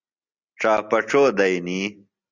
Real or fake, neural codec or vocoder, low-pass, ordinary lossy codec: real; none; 7.2 kHz; Opus, 64 kbps